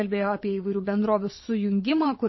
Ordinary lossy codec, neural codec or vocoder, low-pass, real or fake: MP3, 24 kbps; vocoder, 22.05 kHz, 80 mel bands, WaveNeXt; 7.2 kHz; fake